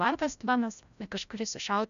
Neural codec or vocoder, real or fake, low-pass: codec, 16 kHz, 0.5 kbps, FreqCodec, larger model; fake; 7.2 kHz